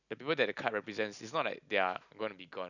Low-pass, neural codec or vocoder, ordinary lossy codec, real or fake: 7.2 kHz; none; none; real